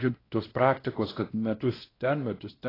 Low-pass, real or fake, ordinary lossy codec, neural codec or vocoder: 5.4 kHz; fake; AAC, 24 kbps; codec, 16 kHz, 1 kbps, X-Codec, WavLM features, trained on Multilingual LibriSpeech